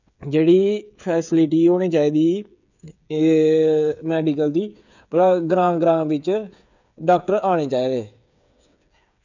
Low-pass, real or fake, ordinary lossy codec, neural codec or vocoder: 7.2 kHz; fake; none; codec, 16 kHz, 8 kbps, FreqCodec, smaller model